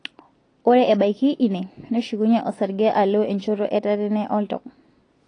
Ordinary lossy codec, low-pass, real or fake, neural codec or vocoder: AAC, 32 kbps; 9.9 kHz; real; none